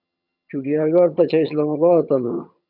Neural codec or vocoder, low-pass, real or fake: vocoder, 22.05 kHz, 80 mel bands, HiFi-GAN; 5.4 kHz; fake